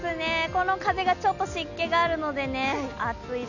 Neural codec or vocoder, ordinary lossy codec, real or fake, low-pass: none; none; real; 7.2 kHz